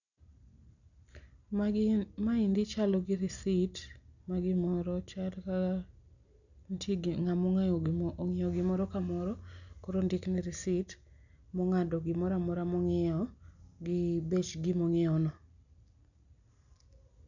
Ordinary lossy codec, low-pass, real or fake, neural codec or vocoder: none; 7.2 kHz; real; none